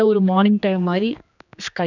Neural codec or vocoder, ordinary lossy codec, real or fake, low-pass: codec, 16 kHz, 2 kbps, X-Codec, HuBERT features, trained on general audio; none; fake; 7.2 kHz